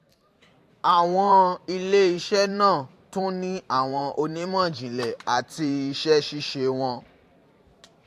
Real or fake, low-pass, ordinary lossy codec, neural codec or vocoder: fake; 14.4 kHz; AAC, 64 kbps; vocoder, 44.1 kHz, 128 mel bands every 512 samples, BigVGAN v2